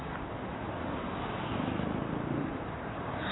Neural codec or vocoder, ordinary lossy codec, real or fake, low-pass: vocoder, 44.1 kHz, 128 mel bands, Pupu-Vocoder; AAC, 16 kbps; fake; 7.2 kHz